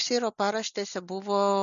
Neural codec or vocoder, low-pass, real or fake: none; 7.2 kHz; real